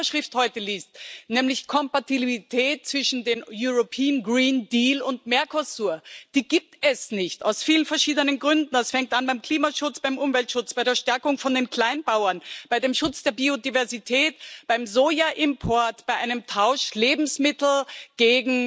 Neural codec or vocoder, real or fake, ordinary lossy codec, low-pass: none; real; none; none